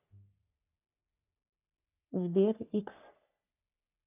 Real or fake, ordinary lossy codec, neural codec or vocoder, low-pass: fake; none; codec, 44.1 kHz, 3.4 kbps, Pupu-Codec; 3.6 kHz